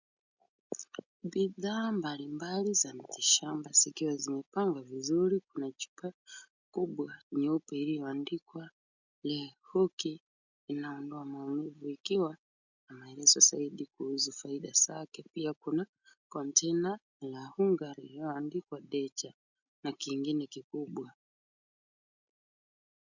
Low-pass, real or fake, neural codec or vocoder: 7.2 kHz; real; none